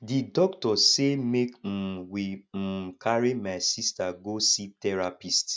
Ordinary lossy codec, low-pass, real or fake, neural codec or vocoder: none; none; real; none